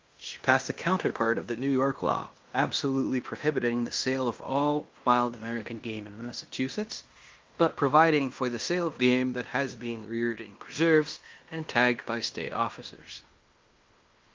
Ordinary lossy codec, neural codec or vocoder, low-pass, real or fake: Opus, 24 kbps; codec, 16 kHz in and 24 kHz out, 0.9 kbps, LongCat-Audio-Codec, fine tuned four codebook decoder; 7.2 kHz; fake